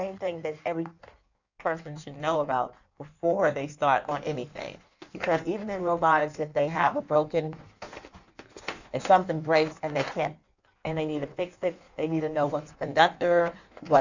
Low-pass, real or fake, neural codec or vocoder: 7.2 kHz; fake; codec, 16 kHz in and 24 kHz out, 1.1 kbps, FireRedTTS-2 codec